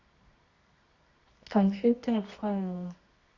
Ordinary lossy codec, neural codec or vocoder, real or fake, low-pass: Opus, 64 kbps; codec, 24 kHz, 0.9 kbps, WavTokenizer, medium music audio release; fake; 7.2 kHz